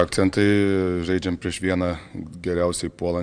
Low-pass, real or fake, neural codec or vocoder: 9.9 kHz; fake; vocoder, 44.1 kHz, 128 mel bands every 512 samples, BigVGAN v2